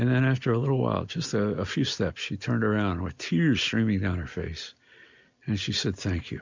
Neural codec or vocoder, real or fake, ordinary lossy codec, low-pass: none; real; MP3, 64 kbps; 7.2 kHz